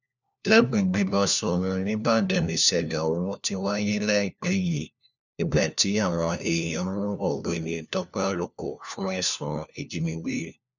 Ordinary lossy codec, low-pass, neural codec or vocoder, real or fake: none; 7.2 kHz; codec, 16 kHz, 1 kbps, FunCodec, trained on LibriTTS, 50 frames a second; fake